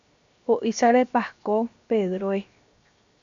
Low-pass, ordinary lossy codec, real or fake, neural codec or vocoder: 7.2 kHz; MP3, 64 kbps; fake; codec, 16 kHz, 0.7 kbps, FocalCodec